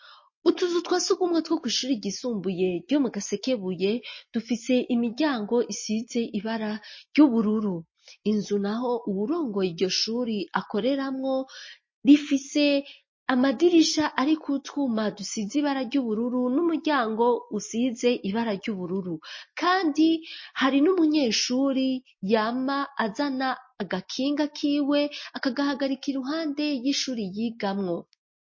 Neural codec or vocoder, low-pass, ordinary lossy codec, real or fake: none; 7.2 kHz; MP3, 32 kbps; real